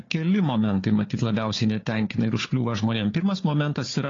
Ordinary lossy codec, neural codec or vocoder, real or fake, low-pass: AAC, 32 kbps; codec, 16 kHz, 4 kbps, FunCodec, trained on Chinese and English, 50 frames a second; fake; 7.2 kHz